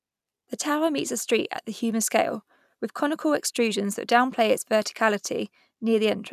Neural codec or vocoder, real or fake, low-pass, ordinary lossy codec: none; real; 14.4 kHz; none